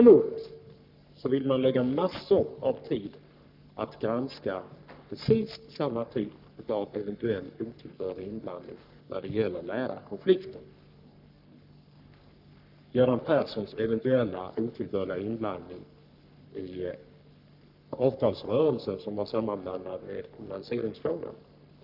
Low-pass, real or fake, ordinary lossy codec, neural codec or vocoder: 5.4 kHz; fake; none; codec, 44.1 kHz, 3.4 kbps, Pupu-Codec